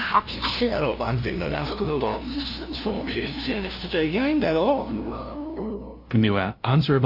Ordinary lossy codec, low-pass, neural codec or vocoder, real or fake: AAC, 48 kbps; 5.4 kHz; codec, 16 kHz, 0.5 kbps, FunCodec, trained on LibriTTS, 25 frames a second; fake